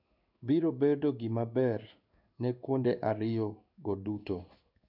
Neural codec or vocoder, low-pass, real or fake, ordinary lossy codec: codec, 16 kHz in and 24 kHz out, 1 kbps, XY-Tokenizer; 5.4 kHz; fake; none